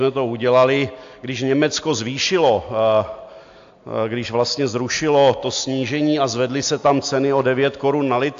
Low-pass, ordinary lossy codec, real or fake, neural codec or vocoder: 7.2 kHz; AAC, 64 kbps; real; none